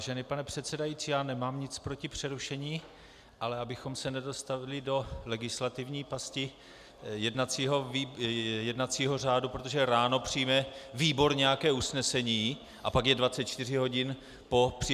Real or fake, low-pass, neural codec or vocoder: real; 14.4 kHz; none